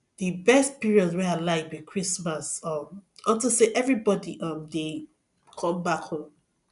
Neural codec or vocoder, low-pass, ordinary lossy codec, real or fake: none; 10.8 kHz; none; real